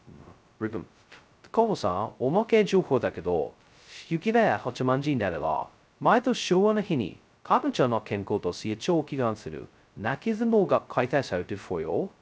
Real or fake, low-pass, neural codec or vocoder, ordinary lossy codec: fake; none; codec, 16 kHz, 0.2 kbps, FocalCodec; none